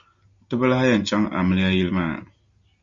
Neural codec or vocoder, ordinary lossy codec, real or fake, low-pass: none; Opus, 64 kbps; real; 7.2 kHz